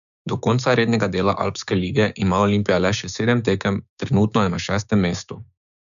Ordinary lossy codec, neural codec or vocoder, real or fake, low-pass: none; codec, 16 kHz, 6 kbps, DAC; fake; 7.2 kHz